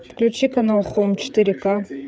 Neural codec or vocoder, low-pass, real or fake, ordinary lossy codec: codec, 16 kHz, 8 kbps, FreqCodec, larger model; none; fake; none